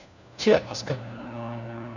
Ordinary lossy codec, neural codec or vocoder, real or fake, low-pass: none; codec, 16 kHz, 1 kbps, FunCodec, trained on LibriTTS, 50 frames a second; fake; 7.2 kHz